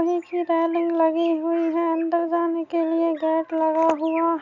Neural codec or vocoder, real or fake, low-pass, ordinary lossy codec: none; real; 7.2 kHz; none